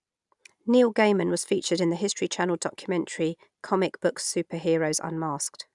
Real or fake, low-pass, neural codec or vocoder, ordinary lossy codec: real; 10.8 kHz; none; none